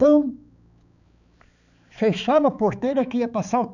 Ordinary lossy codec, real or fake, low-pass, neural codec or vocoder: none; fake; 7.2 kHz; codec, 16 kHz, 4 kbps, X-Codec, HuBERT features, trained on balanced general audio